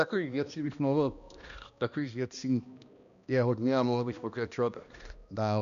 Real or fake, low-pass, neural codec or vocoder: fake; 7.2 kHz; codec, 16 kHz, 1 kbps, X-Codec, HuBERT features, trained on balanced general audio